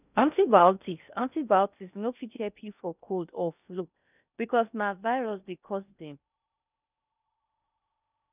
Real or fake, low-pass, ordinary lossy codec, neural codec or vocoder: fake; 3.6 kHz; none; codec, 16 kHz in and 24 kHz out, 0.6 kbps, FocalCodec, streaming, 4096 codes